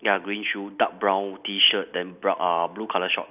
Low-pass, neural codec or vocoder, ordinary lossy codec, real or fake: 3.6 kHz; none; none; real